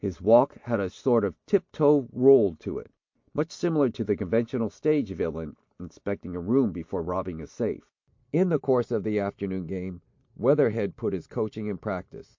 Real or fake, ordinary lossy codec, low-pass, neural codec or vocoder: real; MP3, 48 kbps; 7.2 kHz; none